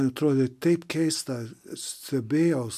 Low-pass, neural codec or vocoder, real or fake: 14.4 kHz; none; real